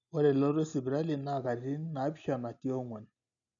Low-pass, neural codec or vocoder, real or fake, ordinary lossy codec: 7.2 kHz; none; real; none